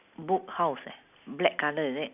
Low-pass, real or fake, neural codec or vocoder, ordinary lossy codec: 3.6 kHz; real; none; none